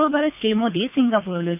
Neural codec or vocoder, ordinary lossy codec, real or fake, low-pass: codec, 24 kHz, 3 kbps, HILCodec; none; fake; 3.6 kHz